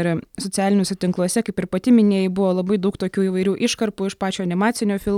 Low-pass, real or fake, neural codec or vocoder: 19.8 kHz; real; none